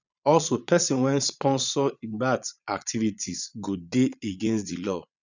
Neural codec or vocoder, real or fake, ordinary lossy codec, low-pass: vocoder, 22.05 kHz, 80 mel bands, Vocos; fake; none; 7.2 kHz